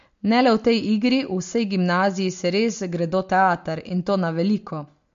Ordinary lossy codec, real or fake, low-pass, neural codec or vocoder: MP3, 48 kbps; real; 7.2 kHz; none